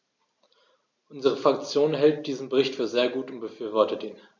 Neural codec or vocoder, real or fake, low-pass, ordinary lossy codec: none; real; 7.2 kHz; none